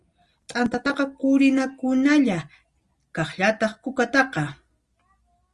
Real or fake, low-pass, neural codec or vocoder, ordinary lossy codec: real; 10.8 kHz; none; Opus, 32 kbps